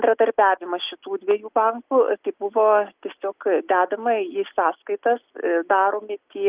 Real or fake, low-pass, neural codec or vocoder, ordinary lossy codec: real; 3.6 kHz; none; Opus, 32 kbps